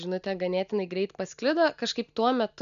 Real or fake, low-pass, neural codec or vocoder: real; 7.2 kHz; none